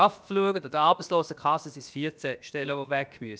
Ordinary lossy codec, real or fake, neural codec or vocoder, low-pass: none; fake; codec, 16 kHz, about 1 kbps, DyCAST, with the encoder's durations; none